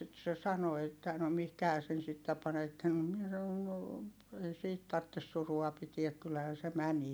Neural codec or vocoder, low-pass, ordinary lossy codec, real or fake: none; none; none; real